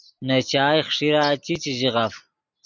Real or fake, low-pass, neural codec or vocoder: real; 7.2 kHz; none